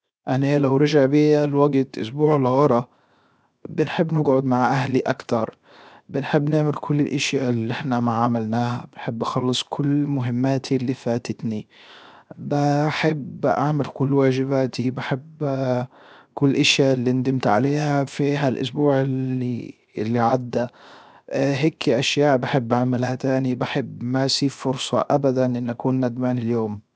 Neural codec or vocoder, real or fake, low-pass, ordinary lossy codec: codec, 16 kHz, 0.7 kbps, FocalCodec; fake; none; none